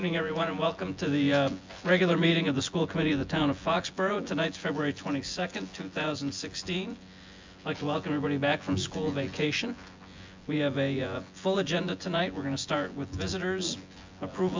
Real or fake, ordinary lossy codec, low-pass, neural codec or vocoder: fake; MP3, 64 kbps; 7.2 kHz; vocoder, 24 kHz, 100 mel bands, Vocos